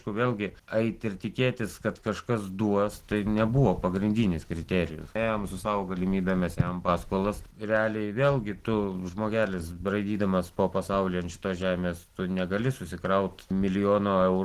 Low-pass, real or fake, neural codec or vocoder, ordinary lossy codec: 14.4 kHz; real; none; Opus, 16 kbps